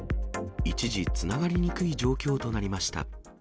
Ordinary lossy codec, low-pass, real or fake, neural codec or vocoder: none; none; real; none